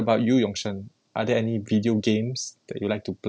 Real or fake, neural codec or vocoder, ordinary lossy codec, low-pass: real; none; none; none